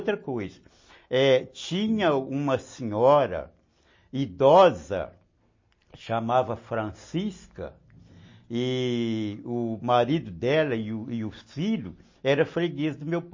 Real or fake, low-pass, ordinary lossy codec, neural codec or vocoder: real; 7.2 kHz; MP3, 32 kbps; none